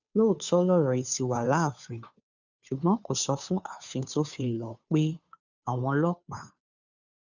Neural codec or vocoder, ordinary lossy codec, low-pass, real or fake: codec, 16 kHz, 2 kbps, FunCodec, trained on Chinese and English, 25 frames a second; none; 7.2 kHz; fake